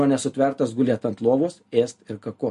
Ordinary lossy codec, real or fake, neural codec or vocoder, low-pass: MP3, 48 kbps; real; none; 14.4 kHz